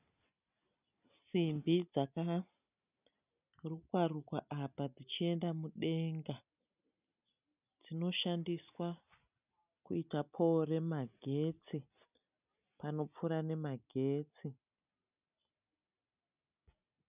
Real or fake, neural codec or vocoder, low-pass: real; none; 3.6 kHz